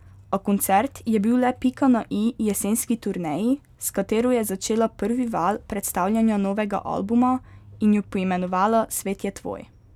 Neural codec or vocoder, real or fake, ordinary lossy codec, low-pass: none; real; none; 19.8 kHz